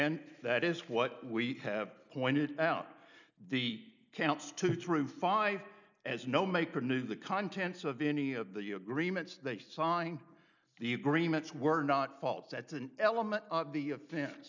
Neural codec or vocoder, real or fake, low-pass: none; real; 7.2 kHz